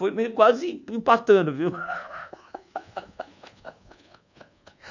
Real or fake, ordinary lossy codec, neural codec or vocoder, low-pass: fake; none; codec, 24 kHz, 1.2 kbps, DualCodec; 7.2 kHz